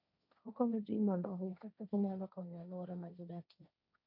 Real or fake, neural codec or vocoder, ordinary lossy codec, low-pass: fake; codec, 16 kHz, 1.1 kbps, Voila-Tokenizer; none; 5.4 kHz